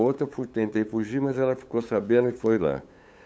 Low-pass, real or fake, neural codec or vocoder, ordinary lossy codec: none; fake; codec, 16 kHz, 8 kbps, FunCodec, trained on LibriTTS, 25 frames a second; none